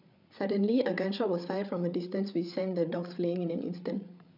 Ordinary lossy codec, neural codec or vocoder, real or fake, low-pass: none; codec, 16 kHz, 8 kbps, FreqCodec, larger model; fake; 5.4 kHz